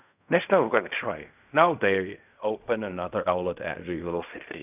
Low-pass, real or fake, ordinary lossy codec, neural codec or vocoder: 3.6 kHz; fake; none; codec, 16 kHz in and 24 kHz out, 0.4 kbps, LongCat-Audio-Codec, fine tuned four codebook decoder